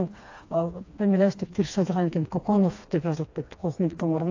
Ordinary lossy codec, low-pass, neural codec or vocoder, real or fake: AAC, 48 kbps; 7.2 kHz; codec, 16 kHz, 2 kbps, FreqCodec, smaller model; fake